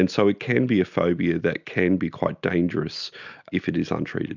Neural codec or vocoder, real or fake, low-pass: none; real; 7.2 kHz